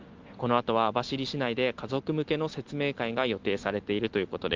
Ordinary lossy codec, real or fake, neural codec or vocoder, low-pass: Opus, 32 kbps; real; none; 7.2 kHz